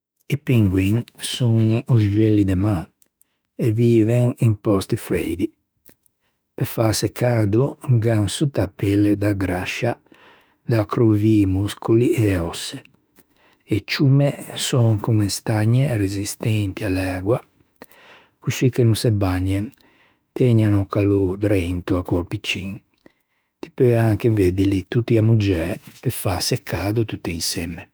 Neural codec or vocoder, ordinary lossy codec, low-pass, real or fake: autoencoder, 48 kHz, 32 numbers a frame, DAC-VAE, trained on Japanese speech; none; none; fake